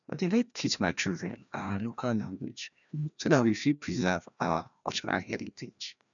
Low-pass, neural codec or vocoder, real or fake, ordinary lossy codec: 7.2 kHz; codec, 16 kHz, 1 kbps, FreqCodec, larger model; fake; none